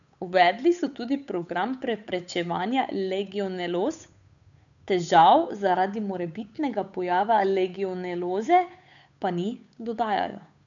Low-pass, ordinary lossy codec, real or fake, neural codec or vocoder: 7.2 kHz; none; fake; codec, 16 kHz, 8 kbps, FunCodec, trained on Chinese and English, 25 frames a second